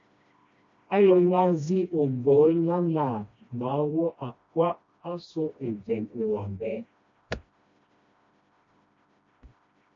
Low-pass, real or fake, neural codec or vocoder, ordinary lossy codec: 7.2 kHz; fake; codec, 16 kHz, 1 kbps, FreqCodec, smaller model; MP3, 48 kbps